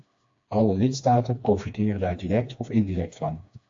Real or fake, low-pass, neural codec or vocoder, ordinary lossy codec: fake; 7.2 kHz; codec, 16 kHz, 2 kbps, FreqCodec, smaller model; AAC, 48 kbps